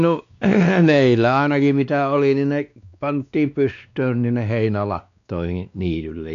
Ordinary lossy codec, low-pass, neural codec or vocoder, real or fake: none; 7.2 kHz; codec, 16 kHz, 2 kbps, X-Codec, WavLM features, trained on Multilingual LibriSpeech; fake